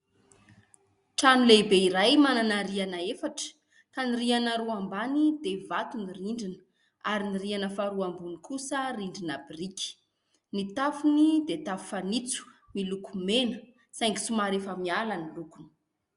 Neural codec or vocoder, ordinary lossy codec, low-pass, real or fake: none; Opus, 64 kbps; 10.8 kHz; real